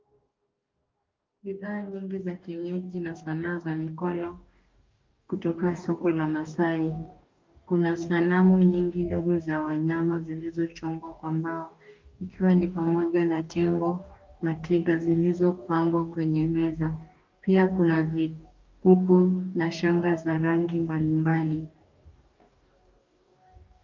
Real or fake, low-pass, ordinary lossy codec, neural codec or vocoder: fake; 7.2 kHz; Opus, 24 kbps; codec, 44.1 kHz, 2.6 kbps, DAC